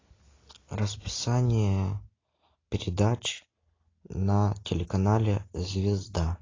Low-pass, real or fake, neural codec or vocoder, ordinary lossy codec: 7.2 kHz; real; none; AAC, 32 kbps